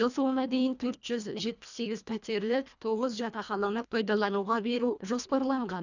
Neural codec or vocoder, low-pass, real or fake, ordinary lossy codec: codec, 24 kHz, 1.5 kbps, HILCodec; 7.2 kHz; fake; none